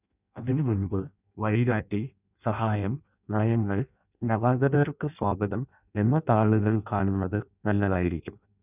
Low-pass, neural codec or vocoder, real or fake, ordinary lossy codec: 3.6 kHz; codec, 16 kHz in and 24 kHz out, 0.6 kbps, FireRedTTS-2 codec; fake; none